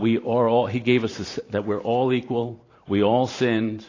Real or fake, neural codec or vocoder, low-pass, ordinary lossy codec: fake; vocoder, 44.1 kHz, 128 mel bands every 512 samples, BigVGAN v2; 7.2 kHz; AAC, 32 kbps